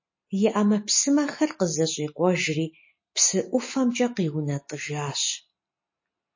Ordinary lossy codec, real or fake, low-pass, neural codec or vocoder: MP3, 32 kbps; real; 7.2 kHz; none